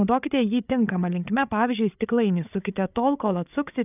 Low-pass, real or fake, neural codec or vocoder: 3.6 kHz; fake; codec, 16 kHz, 8 kbps, FreqCodec, larger model